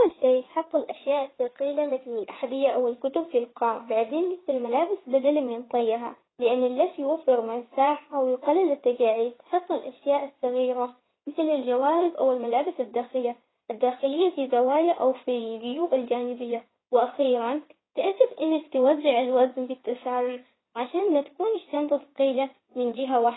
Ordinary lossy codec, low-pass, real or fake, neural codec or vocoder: AAC, 16 kbps; 7.2 kHz; fake; codec, 16 kHz in and 24 kHz out, 2.2 kbps, FireRedTTS-2 codec